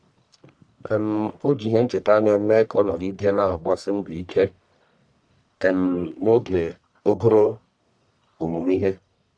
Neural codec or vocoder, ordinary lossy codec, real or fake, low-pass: codec, 44.1 kHz, 1.7 kbps, Pupu-Codec; none; fake; 9.9 kHz